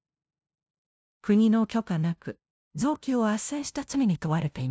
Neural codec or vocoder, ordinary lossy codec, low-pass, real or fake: codec, 16 kHz, 0.5 kbps, FunCodec, trained on LibriTTS, 25 frames a second; none; none; fake